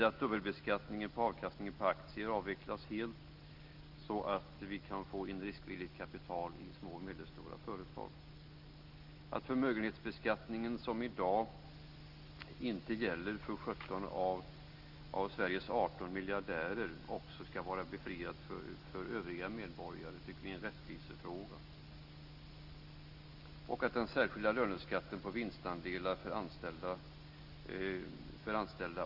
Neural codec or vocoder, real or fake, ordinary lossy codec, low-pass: none; real; Opus, 32 kbps; 5.4 kHz